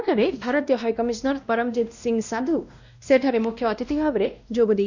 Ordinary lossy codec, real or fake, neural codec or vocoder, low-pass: none; fake; codec, 16 kHz, 1 kbps, X-Codec, WavLM features, trained on Multilingual LibriSpeech; 7.2 kHz